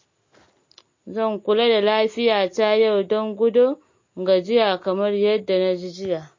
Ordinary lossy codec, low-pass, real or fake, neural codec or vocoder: MP3, 32 kbps; 7.2 kHz; real; none